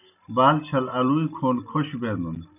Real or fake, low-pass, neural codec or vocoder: real; 3.6 kHz; none